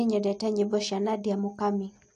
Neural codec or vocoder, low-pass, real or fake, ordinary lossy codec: none; 10.8 kHz; real; AAC, 48 kbps